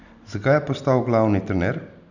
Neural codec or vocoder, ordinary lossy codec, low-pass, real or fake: none; none; 7.2 kHz; real